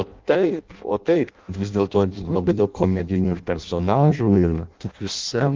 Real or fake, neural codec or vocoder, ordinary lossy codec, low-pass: fake; codec, 16 kHz in and 24 kHz out, 0.6 kbps, FireRedTTS-2 codec; Opus, 32 kbps; 7.2 kHz